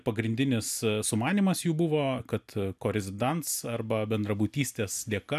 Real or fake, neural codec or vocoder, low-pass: real; none; 14.4 kHz